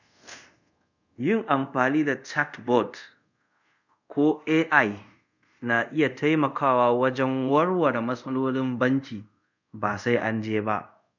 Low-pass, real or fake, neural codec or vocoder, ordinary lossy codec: 7.2 kHz; fake; codec, 24 kHz, 0.5 kbps, DualCodec; none